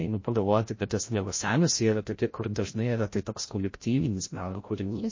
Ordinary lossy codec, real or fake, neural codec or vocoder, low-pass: MP3, 32 kbps; fake; codec, 16 kHz, 0.5 kbps, FreqCodec, larger model; 7.2 kHz